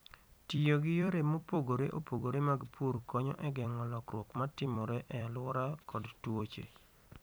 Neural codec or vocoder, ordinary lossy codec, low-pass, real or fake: vocoder, 44.1 kHz, 128 mel bands every 256 samples, BigVGAN v2; none; none; fake